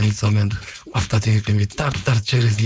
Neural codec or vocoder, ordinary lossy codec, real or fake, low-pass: codec, 16 kHz, 4.8 kbps, FACodec; none; fake; none